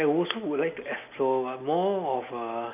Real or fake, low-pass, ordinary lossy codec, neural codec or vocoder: real; 3.6 kHz; none; none